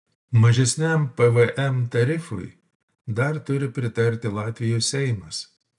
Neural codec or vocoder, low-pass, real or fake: none; 10.8 kHz; real